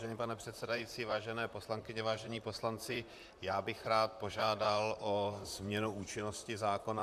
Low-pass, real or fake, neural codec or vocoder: 14.4 kHz; fake; vocoder, 44.1 kHz, 128 mel bands, Pupu-Vocoder